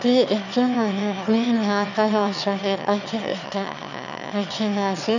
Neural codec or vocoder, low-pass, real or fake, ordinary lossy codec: autoencoder, 22.05 kHz, a latent of 192 numbers a frame, VITS, trained on one speaker; 7.2 kHz; fake; none